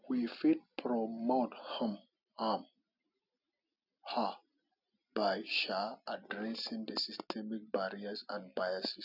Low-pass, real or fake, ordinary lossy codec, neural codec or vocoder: 5.4 kHz; real; none; none